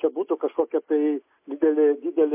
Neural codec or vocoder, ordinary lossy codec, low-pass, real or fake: none; MP3, 24 kbps; 3.6 kHz; real